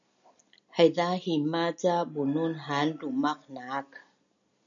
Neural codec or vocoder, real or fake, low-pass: none; real; 7.2 kHz